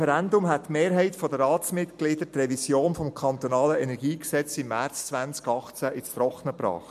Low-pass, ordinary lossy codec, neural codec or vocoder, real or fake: 14.4 kHz; MP3, 64 kbps; none; real